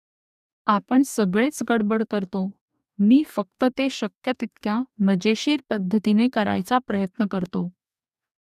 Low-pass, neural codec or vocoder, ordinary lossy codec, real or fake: 14.4 kHz; codec, 44.1 kHz, 2.6 kbps, DAC; none; fake